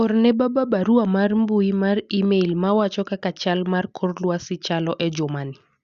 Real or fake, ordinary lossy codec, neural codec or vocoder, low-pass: real; Opus, 64 kbps; none; 7.2 kHz